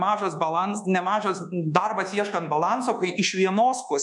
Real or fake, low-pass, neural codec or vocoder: fake; 10.8 kHz; codec, 24 kHz, 1.2 kbps, DualCodec